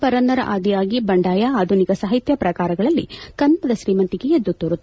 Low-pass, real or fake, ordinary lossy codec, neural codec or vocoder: none; real; none; none